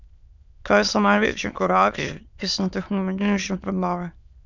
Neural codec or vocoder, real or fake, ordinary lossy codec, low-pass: autoencoder, 22.05 kHz, a latent of 192 numbers a frame, VITS, trained on many speakers; fake; none; 7.2 kHz